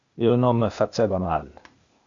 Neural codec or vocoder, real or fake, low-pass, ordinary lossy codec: codec, 16 kHz, 0.8 kbps, ZipCodec; fake; 7.2 kHz; Opus, 64 kbps